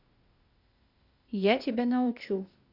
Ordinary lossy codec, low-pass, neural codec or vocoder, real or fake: Opus, 64 kbps; 5.4 kHz; codec, 16 kHz, 0.8 kbps, ZipCodec; fake